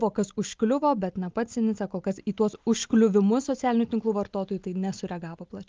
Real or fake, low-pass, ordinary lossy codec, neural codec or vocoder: real; 7.2 kHz; Opus, 32 kbps; none